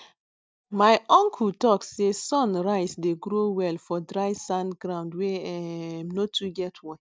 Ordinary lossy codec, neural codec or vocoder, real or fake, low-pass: none; none; real; none